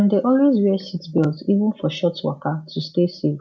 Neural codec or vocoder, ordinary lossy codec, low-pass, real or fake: none; none; none; real